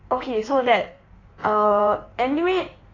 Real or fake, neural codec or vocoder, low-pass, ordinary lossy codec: fake; codec, 16 kHz in and 24 kHz out, 1.1 kbps, FireRedTTS-2 codec; 7.2 kHz; AAC, 32 kbps